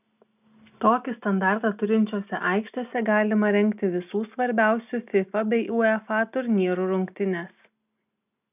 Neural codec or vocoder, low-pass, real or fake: none; 3.6 kHz; real